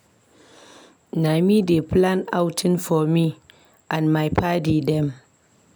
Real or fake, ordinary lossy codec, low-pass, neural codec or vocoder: real; none; none; none